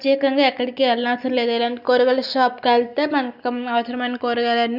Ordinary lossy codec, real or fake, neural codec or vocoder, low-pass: none; real; none; 5.4 kHz